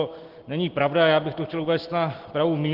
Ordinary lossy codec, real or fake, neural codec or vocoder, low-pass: Opus, 16 kbps; real; none; 5.4 kHz